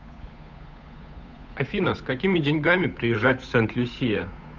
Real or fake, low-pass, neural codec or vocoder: fake; 7.2 kHz; codec, 16 kHz, 8 kbps, FunCodec, trained on Chinese and English, 25 frames a second